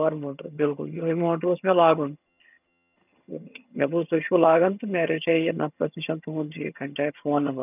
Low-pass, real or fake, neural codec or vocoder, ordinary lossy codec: 3.6 kHz; fake; vocoder, 22.05 kHz, 80 mel bands, HiFi-GAN; none